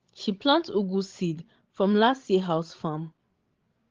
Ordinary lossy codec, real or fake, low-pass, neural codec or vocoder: Opus, 16 kbps; fake; 7.2 kHz; codec, 16 kHz, 16 kbps, FreqCodec, larger model